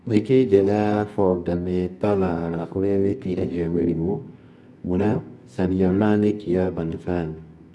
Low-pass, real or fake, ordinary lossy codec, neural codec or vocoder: none; fake; none; codec, 24 kHz, 0.9 kbps, WavTokenizer, medium music audio release